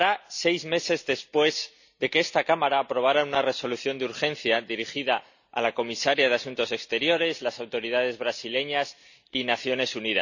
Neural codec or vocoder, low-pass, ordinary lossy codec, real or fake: none; 7.2 kHz; none; real